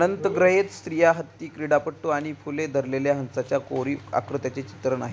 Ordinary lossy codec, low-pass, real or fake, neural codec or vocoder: none; none; real; none